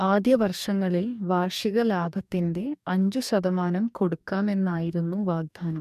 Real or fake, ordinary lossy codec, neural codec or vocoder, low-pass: fake; none; codec, 44.1 kHz, 2.6 kbps, DAC; 14.4 kHz